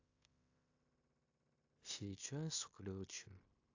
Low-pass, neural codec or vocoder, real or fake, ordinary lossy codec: 7.2 kHz; codec, 16 kHz in and 24 kHz out, 0.9 kbps, LongCat-Audio-Codec, fine tuned four codebook decoder; fake; AAC, 48 kbps